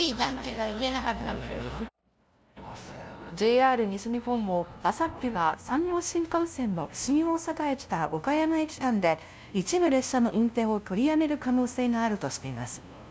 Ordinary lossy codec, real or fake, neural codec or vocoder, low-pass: none; fake; codec, 16 kHz, 0.5 kbps, FunCodec, trained on LibriTTS, 25 frames a second; none